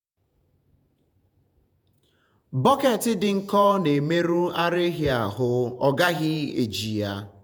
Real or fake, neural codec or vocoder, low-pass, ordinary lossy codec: fake; vocoder, 48 kHz, 128 mel bands, Vocos; none; none